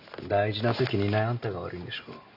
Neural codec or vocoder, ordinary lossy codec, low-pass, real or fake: none; none; 5.4 kHz; real